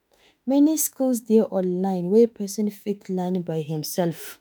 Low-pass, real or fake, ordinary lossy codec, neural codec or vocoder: none; fake; none; autoencoder, 48 kHz, 32 numbers a frame, DAC-VAE, trained on Japanese speech